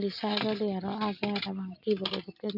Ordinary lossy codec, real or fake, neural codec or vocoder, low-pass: none; real; none; 5.4 kHz